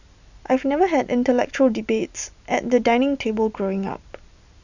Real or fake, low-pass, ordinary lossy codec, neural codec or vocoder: real; 7.2 kHz; none; none